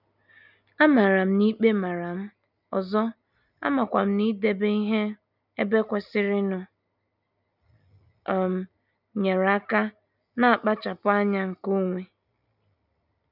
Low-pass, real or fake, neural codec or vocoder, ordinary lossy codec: 5.4 kHz; real; none; none